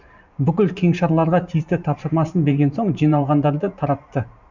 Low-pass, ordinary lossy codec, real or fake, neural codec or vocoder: 7.2 kHz; none; fake; vocoder, 44.1 kHz, 128 mel bands every 512 samples, BigVGAN v2